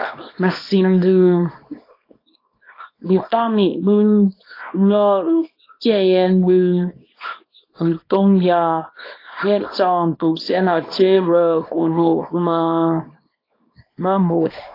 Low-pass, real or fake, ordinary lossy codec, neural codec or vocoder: 5.4 kHz; fake; AAC, 32 kbps; codec, 24 kHz, 0.9 kbps, WavTokenizer, small release